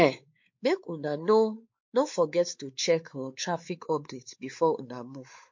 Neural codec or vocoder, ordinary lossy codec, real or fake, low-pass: codec, 16 kHz, 4 kbps, X-Codec, WavLM features, trained on Multilingual LibriSpeech; MP3, 48 kbps; fake; 7.2 kHz